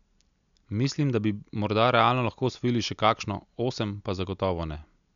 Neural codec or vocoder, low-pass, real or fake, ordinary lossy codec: none; 7.2 kHz; real; none